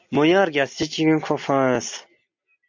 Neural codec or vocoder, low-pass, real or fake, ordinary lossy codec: none; 7.2 kHz; real; MP3, 48 kbps